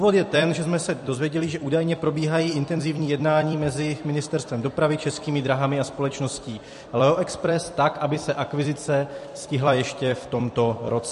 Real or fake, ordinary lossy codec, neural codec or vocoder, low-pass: fake; MP3, 48 kbps; vocoder, 44.1 kHz, 128 mel bands every 256 samples, BigVGAN v2; 14.4 kHz